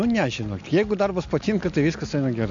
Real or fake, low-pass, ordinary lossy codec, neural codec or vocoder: real; 7.2 kHz; AAC, 64 kbps; none